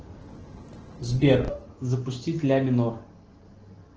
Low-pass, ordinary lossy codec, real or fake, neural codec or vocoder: 7.2 kHz; Opus, 16 kbps; real; none